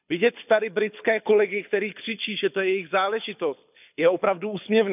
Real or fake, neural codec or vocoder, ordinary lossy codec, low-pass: fake; codec, 24 kHz, 6 kbps, HILCodec; none; 3.6 kHz